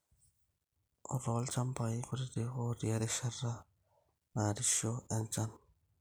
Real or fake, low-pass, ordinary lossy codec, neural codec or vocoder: real; none; none; none